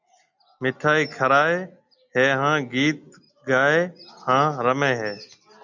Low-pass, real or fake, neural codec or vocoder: 7.2 kHz; real; none